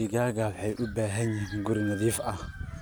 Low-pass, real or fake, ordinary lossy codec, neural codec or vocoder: none; real; none; none